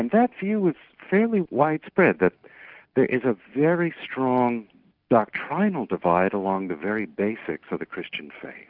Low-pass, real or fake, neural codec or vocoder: 5.4 kHz; real; none